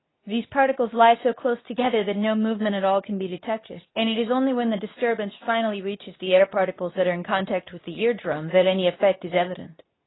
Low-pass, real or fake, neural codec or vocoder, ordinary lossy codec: 7.2 kHz; fake; codec, 24 kHz, 0.9 kbps, WavTokenizer, medium speech release version 2; AAC, 16 kbps